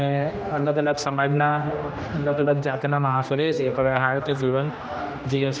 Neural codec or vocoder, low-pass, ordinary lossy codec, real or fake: codec, 16 kHz, 1 kbps, X-Codec, HuBERT features, trained on general audio; none; none; fake